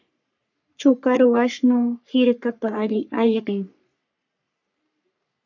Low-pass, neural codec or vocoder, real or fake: 7.2 kHz; codec, 44.1 kHz, 3.4 kbps, Pupu-Codec; fake